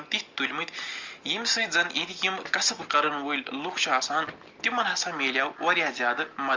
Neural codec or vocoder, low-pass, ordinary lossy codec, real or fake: none; 7.2 kHz; Opus, 32 kbps; real